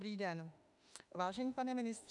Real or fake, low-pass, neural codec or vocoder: fake; 10.8 kHz; autoencoder, 48 kHz, 32 numbers a frame, DAC-VAE, trained on Japanese speech